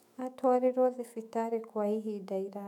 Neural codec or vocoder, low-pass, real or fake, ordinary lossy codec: autoencoder, 48 kHz, 128 numbers a frame, DAC-VAE, trained on Japanese speech; 19.8 kHz; fake; none